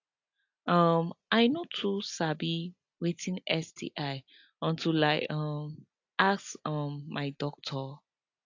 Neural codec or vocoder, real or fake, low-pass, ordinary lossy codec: none; real; 7.2 kHz; AAC, 48 kbps